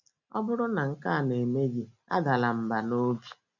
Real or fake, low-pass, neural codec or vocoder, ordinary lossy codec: real; 7.2 kHz; none; none